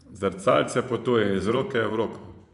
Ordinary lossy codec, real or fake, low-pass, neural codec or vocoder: MP3, 96 kbps; fake; 10.8 kHz; vocoder, 24 kHz, 100 mel bands, Vocos